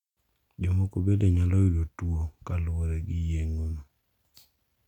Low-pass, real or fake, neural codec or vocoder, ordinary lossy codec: 19.8 kHz; real; none; none